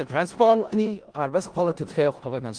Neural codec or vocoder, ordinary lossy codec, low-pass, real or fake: codec, 16 kHz in and 24 kHz out, 0.4 kbps, LongCat-Audio-Codec, four codebook decoder; Opus, 24 kbps; 9.9 kHz; fake